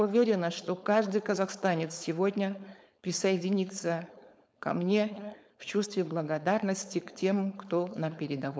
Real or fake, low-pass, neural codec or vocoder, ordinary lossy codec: fake; none; codec, 16 kHz, 4.8 kbps, FACodec; none